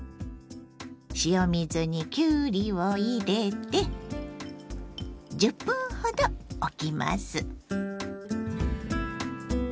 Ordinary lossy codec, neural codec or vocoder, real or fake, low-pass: none; none; real; none